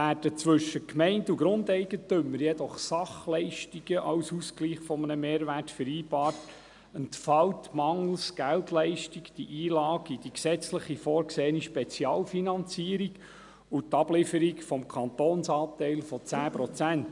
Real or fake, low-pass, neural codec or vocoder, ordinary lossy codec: real; 10.8 kHz; none; none